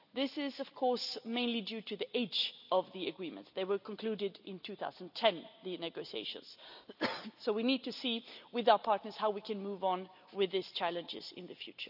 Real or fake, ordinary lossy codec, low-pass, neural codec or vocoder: real; none; 5.4 kHz; none